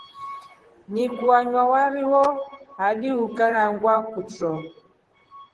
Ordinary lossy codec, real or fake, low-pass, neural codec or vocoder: Opus, 16 kbps; fake; 10.8 kHz; vocoder, 44.1 kHz, 128 mel bands, Pupu-Vocoder